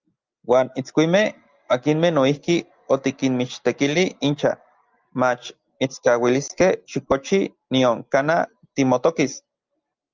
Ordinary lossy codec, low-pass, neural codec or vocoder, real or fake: Opus, 24 kbps; 7.2 kHz; none; real